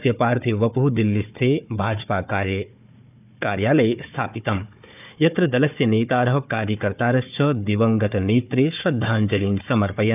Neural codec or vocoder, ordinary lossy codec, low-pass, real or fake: codec, 16 kHz, 4 kbps, FunCodec, trained on Chinese and English, 50 frames a second; none; 3.6 kHz; fake